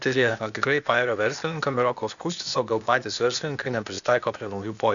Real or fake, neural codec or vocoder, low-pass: fake; codec, 16 kHz, 0.8 kbps, ZipCodec; 7.2 kHz